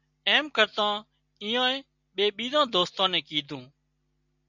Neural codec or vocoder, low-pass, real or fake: none; 7.2 kHz; real